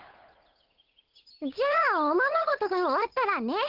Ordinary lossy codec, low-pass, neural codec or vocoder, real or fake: Opus, 16 kbps; 5.4 kHz; codec, 16 kHz, 8 kbps, FunCodec, trained on Chinese and English, 25 frames a second; fake